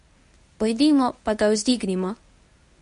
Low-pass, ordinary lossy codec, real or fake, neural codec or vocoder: 10.8 kHz; MP3, 48 kbps; fake; codec, 24 kHz, 0.9 kbps, WavTokenizer, medium speech release version 1